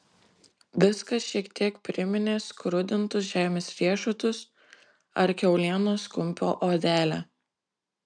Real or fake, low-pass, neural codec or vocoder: real; 9.9 kHz; none